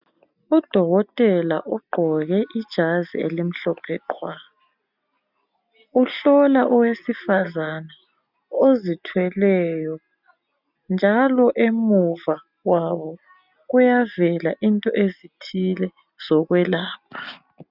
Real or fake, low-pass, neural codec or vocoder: real; 5.4 kHz; none